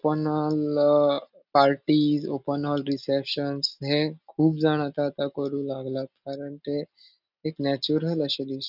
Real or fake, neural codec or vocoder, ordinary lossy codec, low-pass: real; none; none; 5.4 kHz